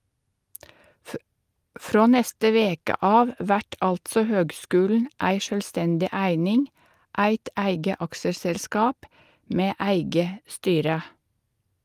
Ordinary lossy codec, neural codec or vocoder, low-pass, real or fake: Opus, 32 kbps; none; 14.4 kHz; real